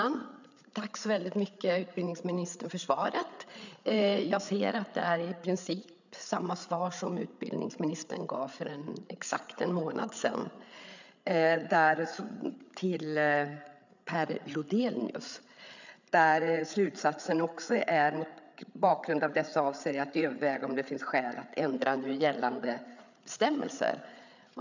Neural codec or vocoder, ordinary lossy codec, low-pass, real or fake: codec, 16 kHz, 8 kbps, FreqCodec, larger model; none; 7.2 kHz; fake